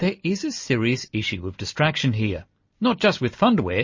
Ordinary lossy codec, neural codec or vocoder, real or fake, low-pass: MP3, 32 kbps; none; real; 7.2 kHz